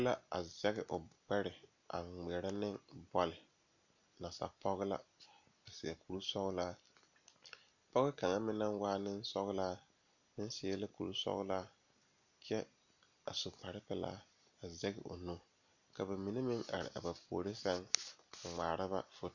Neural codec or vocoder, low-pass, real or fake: none; 7.2 kHz; real